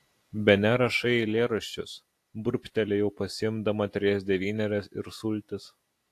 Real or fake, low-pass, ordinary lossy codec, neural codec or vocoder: real; 14.4 kHz; AAC, 64 kbps; none